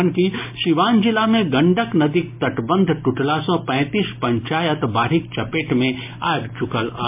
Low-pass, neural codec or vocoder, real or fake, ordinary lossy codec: 3.6 kHz; none; real; MP3, 32 kbps